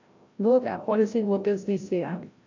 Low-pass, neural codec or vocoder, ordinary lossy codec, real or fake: 7.2 kHz; codec, 16 kHz, 0.5 kbps, FreqCodec, larger model; AAC, 48 kbps; fake